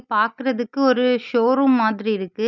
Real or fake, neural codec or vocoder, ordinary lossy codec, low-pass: real; none; none; 7.2 kHz